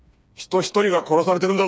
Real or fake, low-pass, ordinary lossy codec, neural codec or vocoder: fake; none; none; codec, 16 kHz, 4 kbps, FreqCodec, smaller model